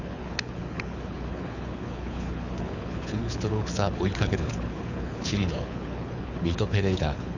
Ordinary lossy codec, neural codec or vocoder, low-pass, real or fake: none; codec, 24 kHz, 6 kbps, HILCodec; 7.2 kHz; fake